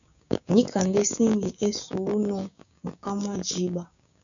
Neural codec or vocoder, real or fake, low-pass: codec, 16 kHz, 6 kbps, DAC; fake; 7.2 kHz